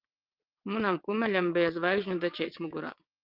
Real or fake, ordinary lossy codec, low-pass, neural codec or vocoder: fake; Opus, 24 kbps; 5.4 kHz; vocoder, 22.05 kHz, 80 mel bands, WaveNeXt